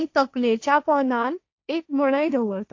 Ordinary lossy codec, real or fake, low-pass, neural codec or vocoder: AAC, 48 kbps; fake; 7.2 kHz; codec, 16 kHz, 1.1 kbps, Voila-Tokenizer